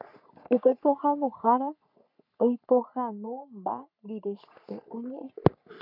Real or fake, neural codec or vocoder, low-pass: fake; codec, 44.1 kHz, 3.4 kbps, Pupu-Codec; 5.4 kHz